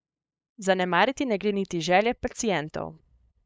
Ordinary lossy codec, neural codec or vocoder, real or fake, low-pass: none; codec, 16 kHz, 8 kbps, FunCodec, trained on LibriTTS, 25 frames a second; fake; none